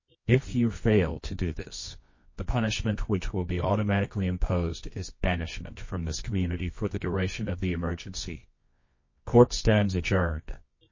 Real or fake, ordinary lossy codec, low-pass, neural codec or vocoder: fake; MP3, 32 kbps; 7.2 kHz; codec, 24 kHz, 0.9 kbps, WavTokenizer, medium music audio release